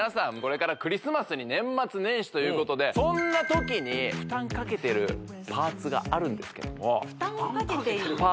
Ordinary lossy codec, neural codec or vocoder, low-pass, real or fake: none; none; none; real